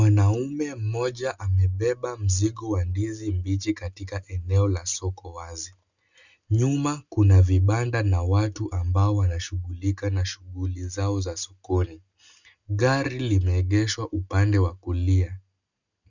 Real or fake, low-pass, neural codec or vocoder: real; 7.2 kHz; none